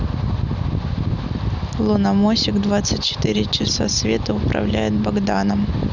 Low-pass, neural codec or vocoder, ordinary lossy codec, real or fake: 7.2 kHz; none; none; real